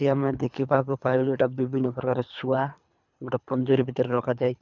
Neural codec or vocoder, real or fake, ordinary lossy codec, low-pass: codec, 24 kHz, 3 kbps, HILCodec; fake; none; 7.2 kHz